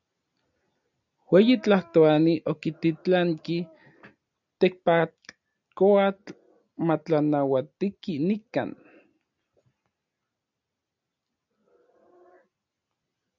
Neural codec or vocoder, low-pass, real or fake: none; 7.2 kHz; real